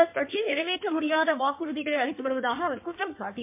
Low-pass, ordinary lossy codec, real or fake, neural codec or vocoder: 3.6 kHz; MP3, 24 kbps; fake; codec, 16 kHz in and 24 kHz out, 1.1 kbps, FireRedTTS-2 codec